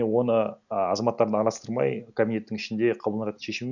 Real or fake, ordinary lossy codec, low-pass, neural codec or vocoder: real; none; 7.2 kHz; none